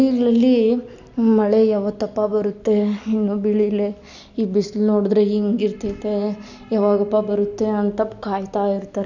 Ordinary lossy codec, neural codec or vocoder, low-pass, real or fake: none; none; 7.2 kHz; real